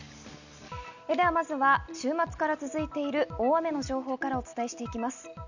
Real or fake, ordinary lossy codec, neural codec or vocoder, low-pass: real; none; none; 7.2 kHz